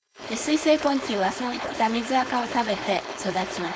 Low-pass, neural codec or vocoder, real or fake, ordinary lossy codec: none; codec, 16 kHz, 4.8 kbps, FACodec; fake; none